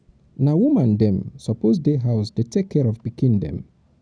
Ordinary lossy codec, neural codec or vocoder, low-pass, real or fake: none; none; 9.9 kHz; real